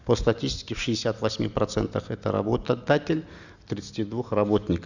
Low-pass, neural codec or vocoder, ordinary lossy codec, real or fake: 7.2 kHz; none; none; real